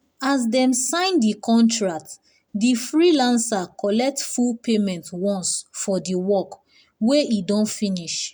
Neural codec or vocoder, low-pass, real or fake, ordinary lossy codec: none; none; real; none